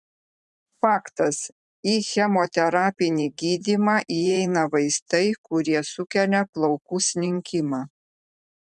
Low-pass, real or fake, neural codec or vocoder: 10.8 kHz; fake; vocoder, 44.1 kHz, 128 mel bands every 512 samples, BigVGAN v2